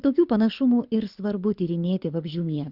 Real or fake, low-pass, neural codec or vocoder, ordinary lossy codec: fake; 5.4 kHz; codec, 24 kHz, 6 kbps, HILCodec; Opus, 64 kbps